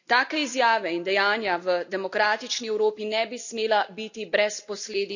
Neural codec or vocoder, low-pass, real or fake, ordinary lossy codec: none; 7.2 kHz; real; none